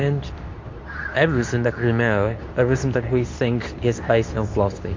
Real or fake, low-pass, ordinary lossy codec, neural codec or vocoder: fake; 7.2 kHz; MP3, 48 kbps; codec, 24 kHz, 0.9 kbps, WavTokenizer, medium speech release version 2